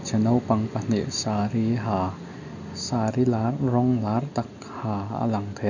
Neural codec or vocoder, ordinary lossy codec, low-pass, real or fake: none; none; 7.2 kHz; real